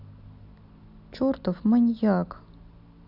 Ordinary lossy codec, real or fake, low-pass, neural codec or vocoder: none; real; 5.4 kHz; none